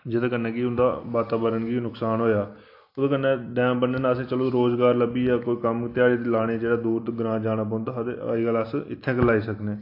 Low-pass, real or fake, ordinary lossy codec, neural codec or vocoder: 5.4 kHz; real; AAC, 32 kbps; none